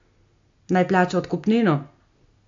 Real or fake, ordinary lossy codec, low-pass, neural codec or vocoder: real; AAC, 48 kbps; 7.2 kHz; none